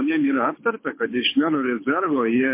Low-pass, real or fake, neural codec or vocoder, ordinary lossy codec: 3.6 kHz; fake; codec, 24 kHz, 6 kbps, HILCodec; MP3, 24 kbps